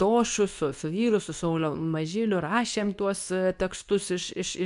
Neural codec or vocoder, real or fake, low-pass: codec, 24 kHz, 0.9 kbps, WavTokenizer, medium speech release version 2; fake; 10.8 kHz